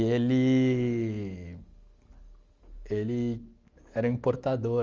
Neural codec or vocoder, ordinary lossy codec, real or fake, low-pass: none; Opus, 32 kbps; real; 7.2 kHz